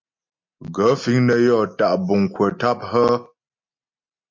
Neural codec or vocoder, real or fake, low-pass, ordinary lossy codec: none; real; 7.2 kHz; MP3, 48 kbps